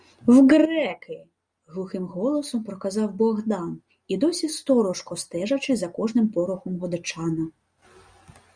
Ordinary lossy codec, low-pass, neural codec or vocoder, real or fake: Opus, 64 kbps; 9.9 kHz; none; real